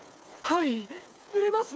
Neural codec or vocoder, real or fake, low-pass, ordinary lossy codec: codec, 16 kHz, 4 kbps, FreqCodec, smaller model; fake; none; none